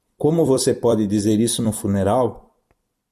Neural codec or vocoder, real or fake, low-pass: vocoder, 44.1 kHz, 128 mel bands every 256 samples, BigVGAN v2; fake; 14.4 kHz